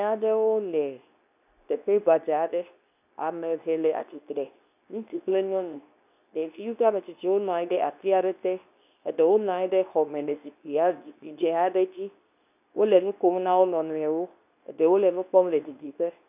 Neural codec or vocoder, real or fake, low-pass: codec, 24 kHz, 0.9 kbps, WavTokenizer, medium speech release version 2; fake; 3.6 kHz